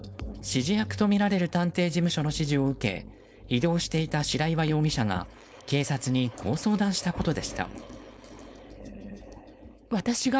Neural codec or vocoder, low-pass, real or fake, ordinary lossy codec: codec, 16 kHz, 4.8 kbps, FACodec; none; fake; none